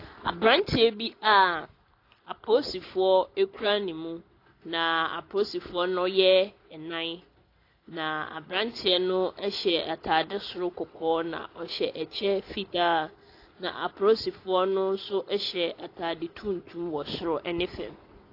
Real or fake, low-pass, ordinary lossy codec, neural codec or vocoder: real; 5.4 kHz; AAC, 32 kbps; none